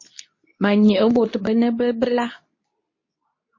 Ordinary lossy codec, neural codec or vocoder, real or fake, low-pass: MP3, 32 kbps; codec, 24 kHz, 0.9 kbps, WavTokenizer, medium speech release version 2; fake; 7.2 kHz